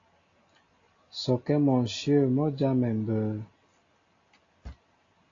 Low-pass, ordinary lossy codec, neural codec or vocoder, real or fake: 7.2 kHz; AAC, 32 kbps; none; real